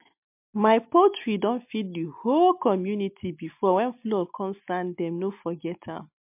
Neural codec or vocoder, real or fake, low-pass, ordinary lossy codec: none; real; 3.6 kHz; MP3, 32 kbps